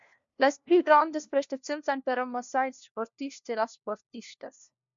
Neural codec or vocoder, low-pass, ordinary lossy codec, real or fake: codec, 16 kHz, 1 kbps, FunCodec, trained on LibriTTS, 50 frames a second; 7.2 kHz; MP3, 64 kbps; fake